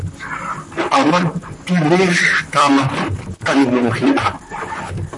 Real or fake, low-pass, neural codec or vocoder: fake; 10.8 kHz; vocoder, 44.1 kHz, 128 mel bands, Pupu-Vocoder